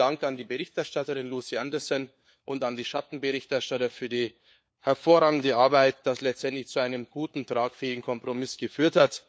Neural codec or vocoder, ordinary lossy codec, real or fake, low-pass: codec, 16 kHz, 4 kbps, FunCodec, trained on LibriTTS, 50 frames a second; none; fake; none